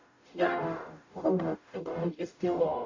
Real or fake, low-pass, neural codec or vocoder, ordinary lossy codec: fake; 7.2 kHz; codec, 44.1 kHz, 0.9 kbps, DAC; AAC, 48 kbps